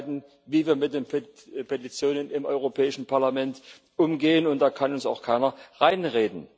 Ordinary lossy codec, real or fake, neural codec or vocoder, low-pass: none; real; none; none